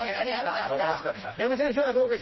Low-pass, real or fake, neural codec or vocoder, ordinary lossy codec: 7.2 kHz; fake; codec, 16 kHz, 1 kbps, FreqCodec, smaller model; MP3, 24 kbps